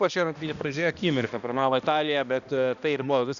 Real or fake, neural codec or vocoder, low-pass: fake; codec, 16 kHz, 1 kbps, X-Codec, HuBERT features, trained on balanced general audio; 7.2 kHz